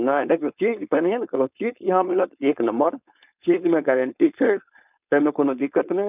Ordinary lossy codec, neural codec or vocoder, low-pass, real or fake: none; codec, 16 kHz, 4.8 kbps, FACodec; 3.6 kHz; fake